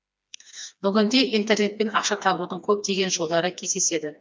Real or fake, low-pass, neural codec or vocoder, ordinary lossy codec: fake; none; codec, 16 kHz, 2 kbps, FreqCodec, smaller model; none